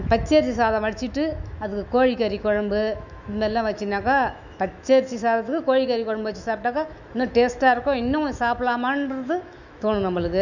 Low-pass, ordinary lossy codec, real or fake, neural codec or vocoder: 7.2 kHz; none; fake; autoencoder, 48 kHz, 128 numbers a frame, DAC-VAE, trained on Japanese speech